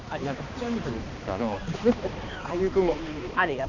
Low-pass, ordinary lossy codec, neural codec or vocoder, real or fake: 7.2 kHz; none; codec, 16 kHz, 2 kbps, X-Codec, HuBERT features, trained on balanced general audio; fake